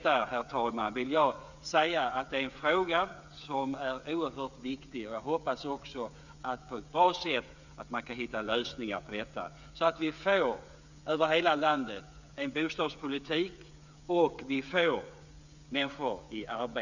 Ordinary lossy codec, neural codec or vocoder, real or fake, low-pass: none; codec, 16 kHz, 8 kbps, FreqCodec, smaller model; fake; 7.2 kHz